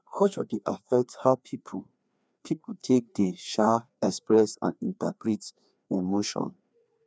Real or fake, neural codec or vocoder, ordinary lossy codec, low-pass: fake; codec, 16 kHz, 2 kbps, FreqCodec, larger model; none; none